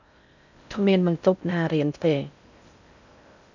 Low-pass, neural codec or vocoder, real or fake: 7.2 kHz; codec, 16 kHz in and 24 kHz out, 0.6 kbps, FocalCodec, streaming, 2048 codes; fake